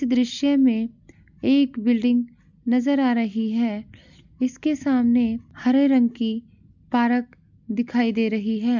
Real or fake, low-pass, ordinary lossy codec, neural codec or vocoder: real; 7.2 kHz; none; none